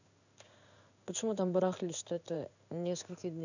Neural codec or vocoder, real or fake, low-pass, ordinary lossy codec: codec, 16 kHz in and 24 kHz out, 1 kbps, XY-Tokenizer; fake; 7.2 kHz; none